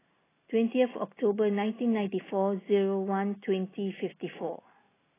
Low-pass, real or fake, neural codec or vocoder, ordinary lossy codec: 3.6 kHz; real; none; AAC, 16 kbps